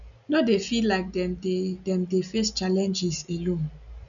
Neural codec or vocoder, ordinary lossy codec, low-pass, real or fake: none; none; 7.2 kHz; real